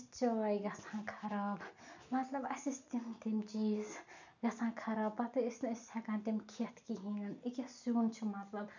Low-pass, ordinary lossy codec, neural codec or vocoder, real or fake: 7.2 kHz; none; none; real